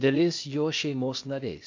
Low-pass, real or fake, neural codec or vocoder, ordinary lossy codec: 7.2 kHz; fake; codec, 16 kHz, 0.8 kbps, ZipCodec; MP3, 48 kbps